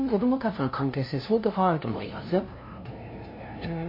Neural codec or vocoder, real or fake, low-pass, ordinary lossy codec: codec, 16 kHz, 0.5 kbps, FunCodec, trained on LibriTTS, 25 frames a second; fake; 5.4 kHz; MP3, 24 kbps